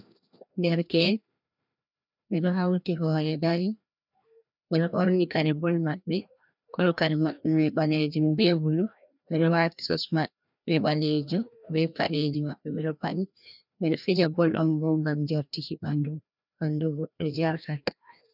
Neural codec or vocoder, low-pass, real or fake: codec, 16 kHz, 1 kbps, FreqCodec, larger model; 5.4 kHz; fake